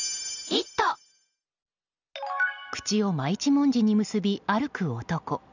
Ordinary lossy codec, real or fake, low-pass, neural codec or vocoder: none; real; 7.2 kHz; none